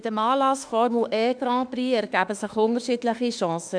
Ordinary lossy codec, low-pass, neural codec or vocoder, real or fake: none; 9.9 kHz; autoencoder, 48 kHz, 32 numbers a frame, DAC-VAE, trained on Japanese speech; fake